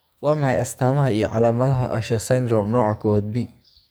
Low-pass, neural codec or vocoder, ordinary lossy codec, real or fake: none; codec, 44.1 kHz, 2.6 kbps, SNAC; none; fake